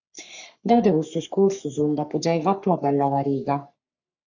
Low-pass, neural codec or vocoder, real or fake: 7.2 kHz; codec, 44.1 kHz, 3.4 kbps, Pupu-Codec; fake